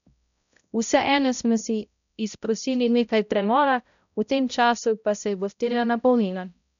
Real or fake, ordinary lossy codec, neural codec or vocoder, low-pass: fake; none; codec, 16 kHz, 0.5 kbps, X-Codec, HuBERT features, trained on balanced general audio; 7.2 kHz